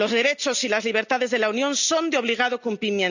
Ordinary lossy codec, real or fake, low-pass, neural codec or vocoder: none; real; 7.2 kHz; none